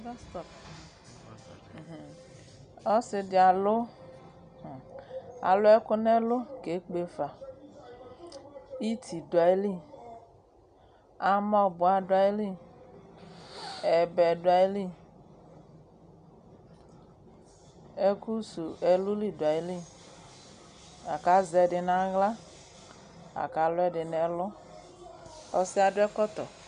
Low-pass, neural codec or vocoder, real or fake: 9.9 kHz; none; real